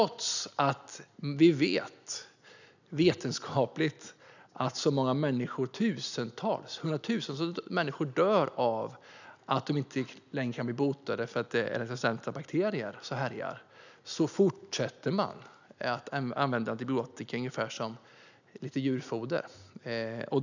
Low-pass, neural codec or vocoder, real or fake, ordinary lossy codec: 7.2 kHz; none; real; none